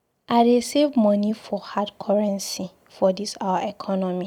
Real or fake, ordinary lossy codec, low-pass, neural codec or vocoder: fake; none; 19.8 kHz; vocoder, 44.1 kHz, 128 mel bands every 256 samples, BigVGAN v2